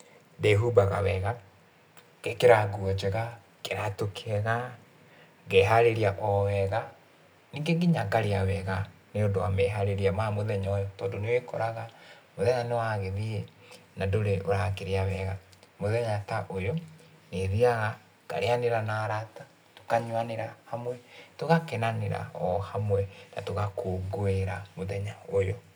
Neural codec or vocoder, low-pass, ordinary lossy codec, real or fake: none; none; none; real